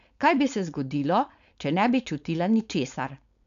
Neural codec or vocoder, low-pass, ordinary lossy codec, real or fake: codec, 16 kHz, 4.8 kbps, FACodec; 7.2 kHz; none; fake